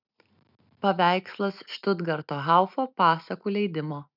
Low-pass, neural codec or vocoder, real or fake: 5.4 kHz; none; real